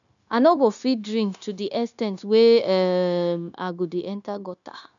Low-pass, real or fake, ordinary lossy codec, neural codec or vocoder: 7.2 kHz; fake; none; codec, 16 kHz, 0.9 kbps, LongCat-Audio-Codec